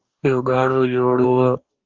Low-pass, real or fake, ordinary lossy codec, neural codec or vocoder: 7.2 kHz; fake; Opus, 32 kbps; codec, 44.1 kHz, 2.6 kbps, DAC